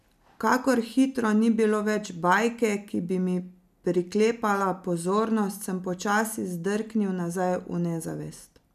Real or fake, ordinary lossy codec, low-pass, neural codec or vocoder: real; none; 14.4 kHz; none